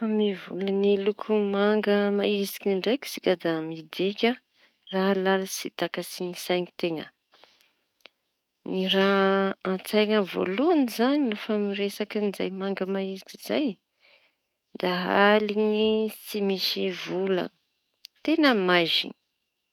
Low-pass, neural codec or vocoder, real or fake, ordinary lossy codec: 19.8 kHz; codec, 44.1 kHz, 7.8 kbps, DAC; fake; none